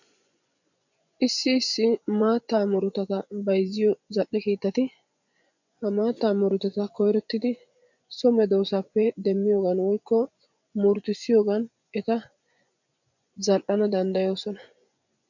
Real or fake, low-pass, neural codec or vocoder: fake; 7.2 kHz; vocoder, 24 kHz, 100 mel bands, Vocos